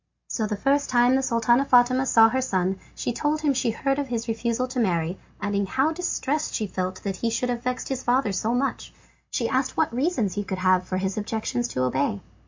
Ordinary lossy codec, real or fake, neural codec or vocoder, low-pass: MP3, 48 kbps; real; none; 7.2 kHz